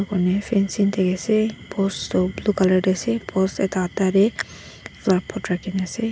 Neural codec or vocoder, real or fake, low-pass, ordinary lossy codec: none; real; none; none